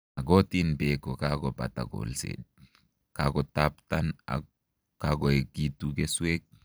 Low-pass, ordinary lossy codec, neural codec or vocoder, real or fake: none; none; vocoder, 44.1 kHz, 128 mel bands every 256 samples, BigVGAN v2; fake